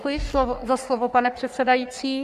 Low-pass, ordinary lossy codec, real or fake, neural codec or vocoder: 14.4 kHz; Opus, 64 kbps; fake; codec, 44.1 kHz, 3.4 kbps, Pupu-Codec